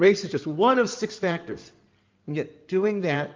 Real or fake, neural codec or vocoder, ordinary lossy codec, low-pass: fake; codec, 16 kHz in and 24 kHz out, 2.2 kbps, FireRedTTS-2 codec; Opus, 32 kbps; 7.2 kHz